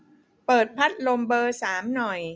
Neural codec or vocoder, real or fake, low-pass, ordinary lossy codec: none; real; none; none